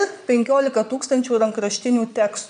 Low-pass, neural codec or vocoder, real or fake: 9.9 kHz; vocoder, 22.05 kHz, 80 mel bands, Vocos; fake